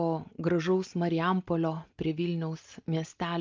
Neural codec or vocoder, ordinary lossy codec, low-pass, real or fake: none; Opus, 24 kbps; 7.2 kHz; real